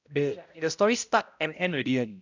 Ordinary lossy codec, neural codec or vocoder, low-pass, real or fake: none; codec, 16 kHz, 0.5 kbps, X-Codec, HuBERT features, trained on balanced general audio; 7.2 kHz; fake